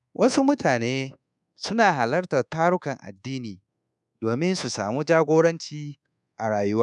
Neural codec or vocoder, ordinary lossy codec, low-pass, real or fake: codec, 24 kHz, 1.2 kbps, DualCodec; none; 10.8 kHz; fake